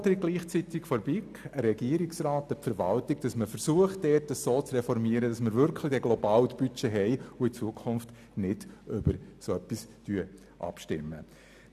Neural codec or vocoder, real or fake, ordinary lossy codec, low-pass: none; real; none; 14.4 kHz